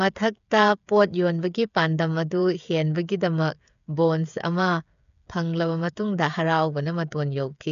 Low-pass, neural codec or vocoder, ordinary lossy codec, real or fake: 7.2 kHz; codec, 16 kHz, 8 kbps, FreqCodec, smaller model; none; fake